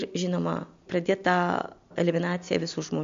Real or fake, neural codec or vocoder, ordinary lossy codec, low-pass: real; none; MP3, 48 kbps; 7.2 kHz